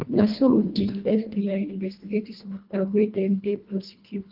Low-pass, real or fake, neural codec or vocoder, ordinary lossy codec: 5.4 kHz; fake; codec, 24 kHz, 1.5 kbps, HILCodec; Opus, 32 kbps